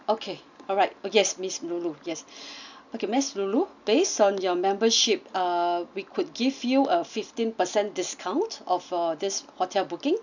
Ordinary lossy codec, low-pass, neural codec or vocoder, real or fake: none; 7.2 kHz; none; real